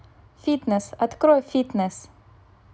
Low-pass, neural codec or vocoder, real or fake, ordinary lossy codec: none; none; real; none